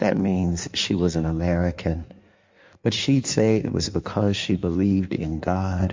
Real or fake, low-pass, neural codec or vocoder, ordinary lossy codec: fake; 7.2 kHz; codec, 16 kHz in and 24 kHz out, 1.1 kbps, FireRedTTS-2 codec; MP3, 48 kbps